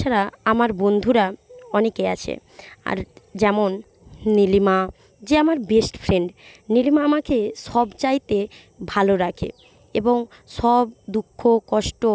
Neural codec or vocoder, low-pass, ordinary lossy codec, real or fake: none; none; none; real